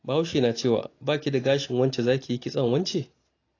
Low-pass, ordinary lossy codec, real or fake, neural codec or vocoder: 7.2 kHz; AAC, 32 kbps; real; none